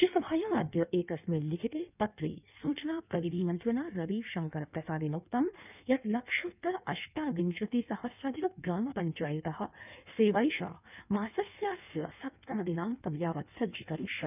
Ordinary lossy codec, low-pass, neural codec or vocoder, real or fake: none; 3.6 kHz; codec, 16 kHz in and 24 kHz out, 1.1 kbps, FireRedTTS-2 codec; fake